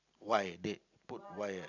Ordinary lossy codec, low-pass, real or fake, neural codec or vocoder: none; 7.2 kHz; fake; vocoder, 44.1 kHz, 128 mel bands every 256 samples, BigVGAN v2